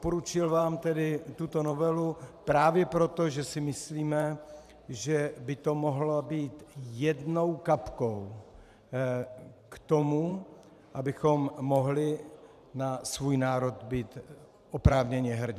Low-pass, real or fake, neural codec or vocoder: 14.4 kHz; fake; vocoder, 44.1 kHz, 128 mel bands every 512 samples, BigVGAN v2